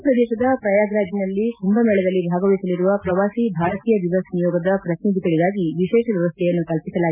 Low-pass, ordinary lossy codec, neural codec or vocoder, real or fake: 3.6 kHz; none; none; real